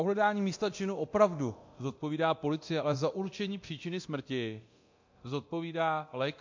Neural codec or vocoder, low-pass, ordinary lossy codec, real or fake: codec, 24 kHz, 0.9 kbps, DualCodec; 7.2 kHz; MP3, 48 kbps; fake